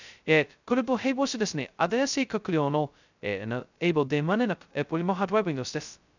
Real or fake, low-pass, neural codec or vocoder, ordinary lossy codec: fake; 7.2 kHz; codec, 16 kHz, 0.2 kbps, FocalCodec; none